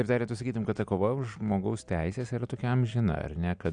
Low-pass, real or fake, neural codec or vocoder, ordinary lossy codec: 9.9 kHz; real; none; Opus, 64 kbps